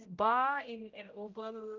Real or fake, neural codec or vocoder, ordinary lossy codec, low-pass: fake; codec, 16 kHz, 0.5 kbps, X-Codec, HuBERT features, trained on general audio; Opus, 24 kbps; 7.2 kHz